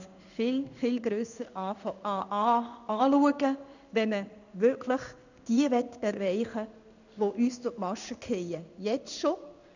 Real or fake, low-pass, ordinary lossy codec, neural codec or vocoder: fake; 7.2 kHz; none; codec, 16 kHz in and 24 kHz out, 1 kbps, XY-Tokenizer